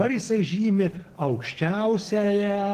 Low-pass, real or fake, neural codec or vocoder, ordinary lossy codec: 14.4 kHz; fake; codec, 44.1 kHz, 2.6 kbps, SNAC; Opus, 16 kbps